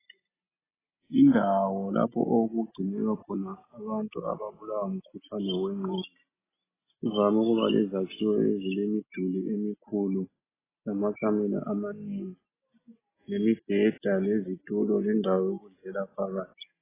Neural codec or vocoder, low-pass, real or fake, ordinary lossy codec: none; 3.6 kHz; real; AAC, 16 kbps